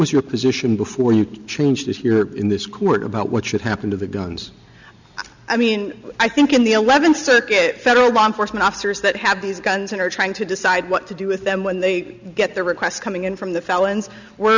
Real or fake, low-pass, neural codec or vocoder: real; 7.2 kHz; none